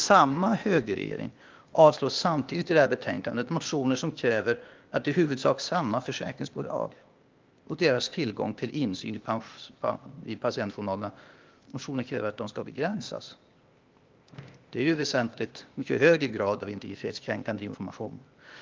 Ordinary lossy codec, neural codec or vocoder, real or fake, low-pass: Opus, 32 kbps; codec, 16 kHz, 0.8 kbps, ZipCodec; fake; 7.2 kHz